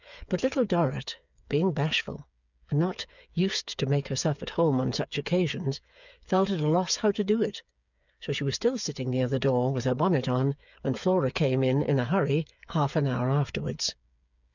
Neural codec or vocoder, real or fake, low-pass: codec, 16 kHz, 8 kbps, FreqCodec, smaller model; fake; 7.2 kHz